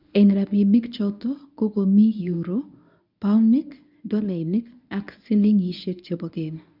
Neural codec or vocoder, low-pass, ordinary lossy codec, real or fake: codec, 24 kHz, 0.9 kbps, WavTokenizer, medium speech release version 1; 5.4 kHz; none; fake